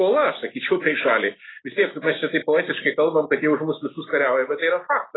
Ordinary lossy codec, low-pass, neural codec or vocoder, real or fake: AAC, 16 kbps; 7.2 kHz; none; real